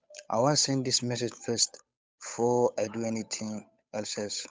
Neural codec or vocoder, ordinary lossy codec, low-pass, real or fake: codec, 16 kHz, 8 kbps, FunCodec, trained on Chinese and English, 25 frames a second; none; none; fake